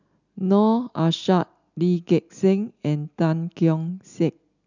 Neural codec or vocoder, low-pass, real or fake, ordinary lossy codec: none; 7.2 kHz; real; none